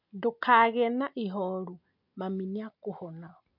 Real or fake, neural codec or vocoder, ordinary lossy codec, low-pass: real; none; MP3, 48 kbps; 5.4 kHz